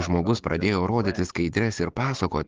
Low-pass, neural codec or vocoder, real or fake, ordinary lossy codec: 7.2 kHz; codec, 16 kHz, 6 kbps, DAC; fake; Opus, 16 kbps